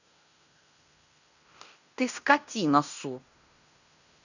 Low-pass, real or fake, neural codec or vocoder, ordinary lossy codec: 7.2 kHz; fake; codec, 16 kHz in and 24 kHz out, 0.9 kbps, LongCat-Audio-Codec, fine tuned four codebook decoder; none